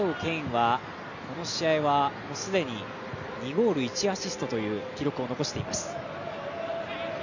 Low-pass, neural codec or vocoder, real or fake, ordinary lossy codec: 7.2 kHz; none; real; none